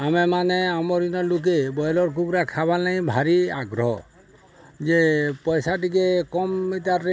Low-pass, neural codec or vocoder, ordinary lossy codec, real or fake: none; none; none; real